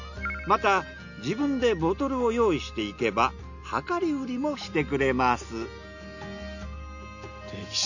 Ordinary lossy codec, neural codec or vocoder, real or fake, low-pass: none; none; real; 7.2 kHz